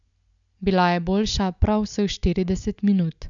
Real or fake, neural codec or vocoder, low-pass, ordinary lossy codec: real; none; 7.2 kHz; none